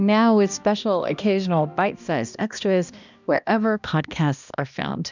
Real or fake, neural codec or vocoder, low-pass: fake; codec, 16 kHz, 1 kbps, X-Codec, HuBERT features, trained on balanced general audio; 7.2 kHz